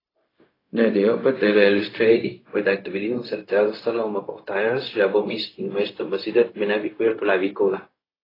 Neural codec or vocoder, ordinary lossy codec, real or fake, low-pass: codec, 16 kHz, 0.4 kbps, LongCat-Audio-Codec; AAC, 24 kbps; fake; 5.4 kHz